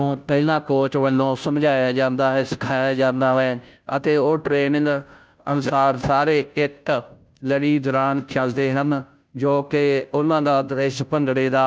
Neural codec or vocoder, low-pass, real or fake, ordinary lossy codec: codec, 16 kHz, 0.5 kbps, FunCodec, trained on Chinese and English, 25 frames a second; none; fake; none